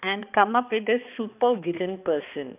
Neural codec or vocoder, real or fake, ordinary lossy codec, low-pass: codec, 16 kHz, 2 kbps, X-Codec, HuBERT features, trained on balanced general audio; fake; none; 3.6 kHz